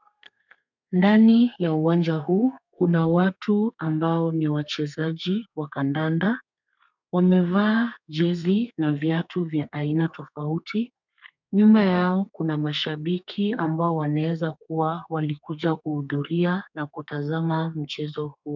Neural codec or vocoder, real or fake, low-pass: codec, 32 kHz, 1.9 kbps, SNAC; fake; 7.2 kHz